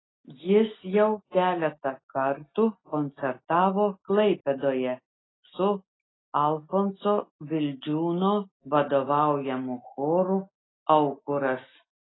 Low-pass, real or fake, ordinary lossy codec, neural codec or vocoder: 7.2 kHz; real; AAC, 16 kbps; none